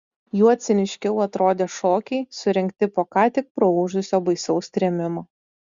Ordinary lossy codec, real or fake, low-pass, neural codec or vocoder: Opus, 64 kbps; real; 7.2 kHz; none